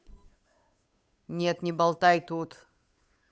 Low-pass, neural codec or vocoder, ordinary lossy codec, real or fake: none; codec, 16 kHz, 8 kbps, FunCodec, trained on Chinese and English, 25 frames a second; none; fake